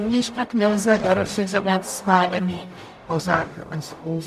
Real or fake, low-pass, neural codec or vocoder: fake; 14.4 kHz; codec, 44.1 kHz, 0.9 kbps, DAC